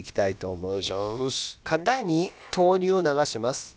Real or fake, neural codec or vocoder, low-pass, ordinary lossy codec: fake; codec, 16 kHz, about 1 kbps, DyCAST, with the encoder's durations; none; none